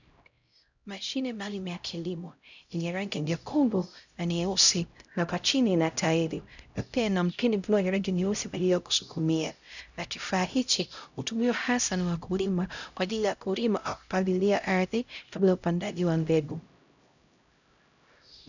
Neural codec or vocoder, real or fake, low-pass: codec, 16 kHz, 0.5 kbps, X-Codec, HuBERT features, trained on LibriSpeech; fake; 7.2 kHz